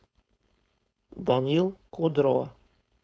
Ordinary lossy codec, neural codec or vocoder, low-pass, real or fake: none; codec, 16 kHz, 4.8 kbps, FACodec; none; fake